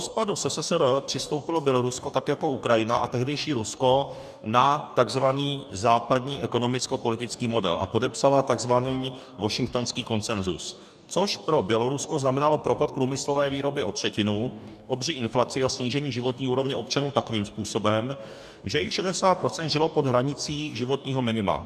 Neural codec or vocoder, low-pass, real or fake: codec, 44.1 kHz, 2.6 kbps, DAC; 14.4 kHz; fake